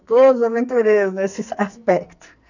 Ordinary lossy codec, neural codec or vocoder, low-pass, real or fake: none; codec, 44.1 kHz, 2.6 kbps, SNAC; 7.2 kHz; fake